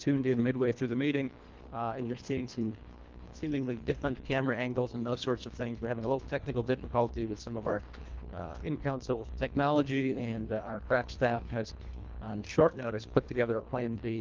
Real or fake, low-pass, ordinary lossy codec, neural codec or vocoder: fake; 7.2 kHz; Opus, 32 kbps; codec, 24 kHz, 1.5 kbps, HILCodec